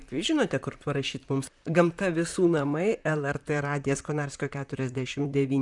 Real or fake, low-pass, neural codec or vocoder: fake; 10.8 kHz; vocoder, 44.1 kHz, 128 mel bands, Pupu-Vocoder